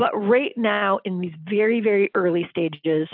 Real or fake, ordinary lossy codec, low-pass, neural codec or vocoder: real; AAC, 48 kbps; 5.4 kHz; none